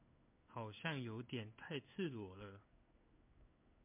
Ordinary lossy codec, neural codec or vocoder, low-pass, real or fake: MP3, 32 kbps; codec, 16 kHz in and 24 kHz out, 1 kbps, XY-Tokenizer; 3.6 kHz; fake